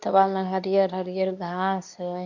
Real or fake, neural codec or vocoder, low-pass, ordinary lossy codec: fake; codec, 24 kHz, 0.9 kbps, WavTokenizer, medium speech release version 2; 7.2 kHz; none